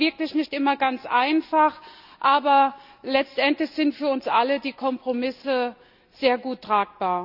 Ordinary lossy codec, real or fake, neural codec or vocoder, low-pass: none; real; none; 5.4 kHz